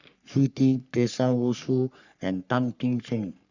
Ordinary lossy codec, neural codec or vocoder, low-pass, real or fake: none; codec, 44.1 kHz, 3.4 kbps, Pupu-Codec; 7.2 kHz; fake